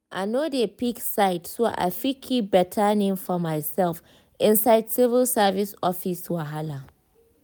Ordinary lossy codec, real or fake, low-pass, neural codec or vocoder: none; real; none; none